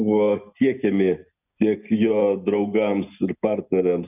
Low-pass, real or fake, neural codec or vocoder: 3.6 kHz; real; none